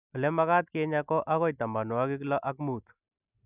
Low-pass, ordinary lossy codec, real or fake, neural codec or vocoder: 3.6 kHz; none; real; none